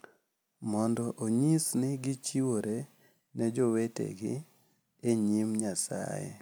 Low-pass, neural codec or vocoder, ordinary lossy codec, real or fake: none; none; none; real